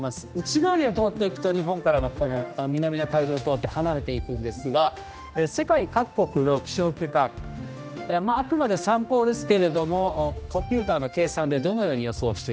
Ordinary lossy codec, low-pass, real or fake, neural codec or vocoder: none; none; fake; codec, 16 kHz, 1 kbps, X-Codec, HuBERT features, trained on general audio